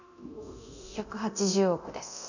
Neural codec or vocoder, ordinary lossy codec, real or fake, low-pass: codec, 24 kHz, 0.9 kbps, DualCodec; none; fake; 7.2 kHz